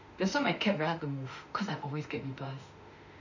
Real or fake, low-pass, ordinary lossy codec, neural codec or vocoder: fake; 7.2 kHz; none; autoencoder, 48 kHz, 32 numbers a frame, DAC-VAE, trained on Japanese speech